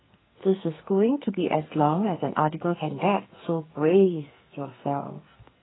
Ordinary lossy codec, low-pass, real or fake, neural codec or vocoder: AAC, 16 kbps; 7.2 kHz; fake; codec, 44.1 kHz, 2.6 kbps, SNAC